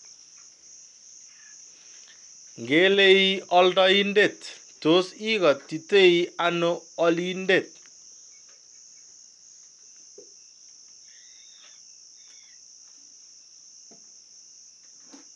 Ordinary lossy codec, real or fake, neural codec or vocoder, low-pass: MP3, 96 kbps; real; none; 10.8 kHz